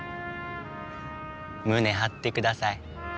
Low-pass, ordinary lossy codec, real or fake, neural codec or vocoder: none; none; real; none